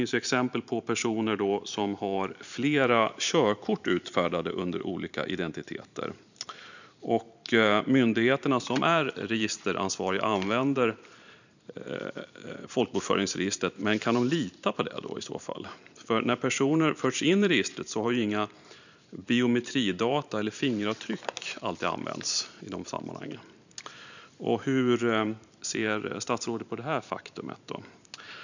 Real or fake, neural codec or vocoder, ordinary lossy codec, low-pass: fake; vocoder, 44.1 kHz, 128 mel bands every 512 samples, BigVGAN v2; none; 7.2 kHz